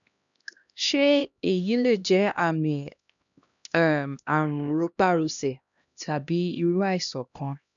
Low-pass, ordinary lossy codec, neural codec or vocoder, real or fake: 7.2 kHz; none; codec, 16 kHz, 1 kbps, X-Codec, HuBERT features, trained on LibriSpeech; fake